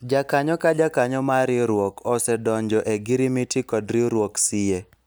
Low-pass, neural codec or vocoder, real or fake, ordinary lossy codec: none; none; real; none